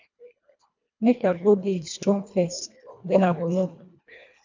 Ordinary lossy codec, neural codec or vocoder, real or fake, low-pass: MP3, 64 kbps; codec, 24 kHz, 1.5 kbps, HILCodec; fake; 7.2 kHz